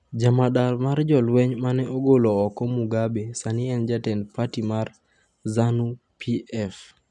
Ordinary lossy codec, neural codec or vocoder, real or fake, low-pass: none; none; real; 10.8 kHz